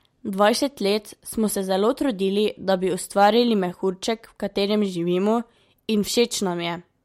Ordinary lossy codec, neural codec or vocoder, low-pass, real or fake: MP3, 64 kbps; none; 19.8 kHz; real